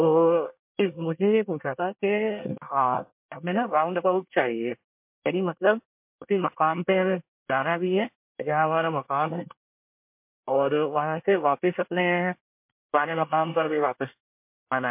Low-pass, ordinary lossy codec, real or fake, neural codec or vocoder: 3.6 kHz; MP3, 32 kbps; fake; codec, 24 kHz, 1 kbps, SNAC